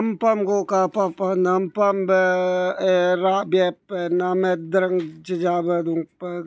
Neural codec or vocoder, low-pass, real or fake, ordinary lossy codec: none; none; real; none